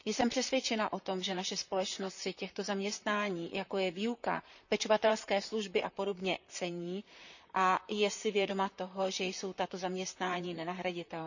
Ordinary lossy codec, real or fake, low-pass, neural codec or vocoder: none; fake; 7.2 kHz; vocoder, 44.1 kHz, 128 mel bands, Pupu-Vocoder